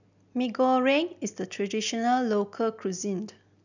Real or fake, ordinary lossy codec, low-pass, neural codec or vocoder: real; none; 7.2 kHz; none